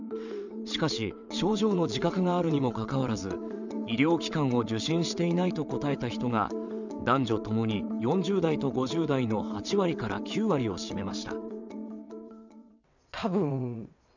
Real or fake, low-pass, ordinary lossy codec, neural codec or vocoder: fake; 7.2 kHz; none; vocoder, 22.05 kHz, 80 mel bands, WaveNeXt